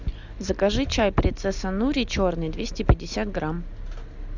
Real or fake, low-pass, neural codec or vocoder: real; 7.2 kHz; none